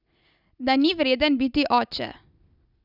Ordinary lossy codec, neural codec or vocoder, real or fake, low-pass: none; none; real; 5.4 kHz